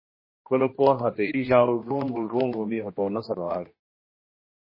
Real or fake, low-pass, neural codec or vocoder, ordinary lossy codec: fake; 5.4 kHz; codec, 16 kHz, 1 kbps, X-Codec, HuBERT features, trained on general audio; MP3, 24 kbps